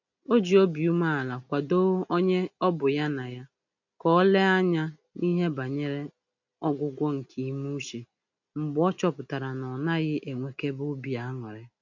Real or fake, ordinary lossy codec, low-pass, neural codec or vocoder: real; none; 7.2 kHz; none